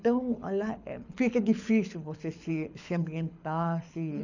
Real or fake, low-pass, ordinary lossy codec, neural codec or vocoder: fake; 7.2 kHz; none; codec, 24 kHz, 6 kbps, HILCodec